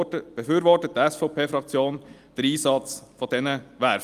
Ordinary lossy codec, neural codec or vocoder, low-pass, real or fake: Opus, 32 kbps; none; 14.4 kHz; real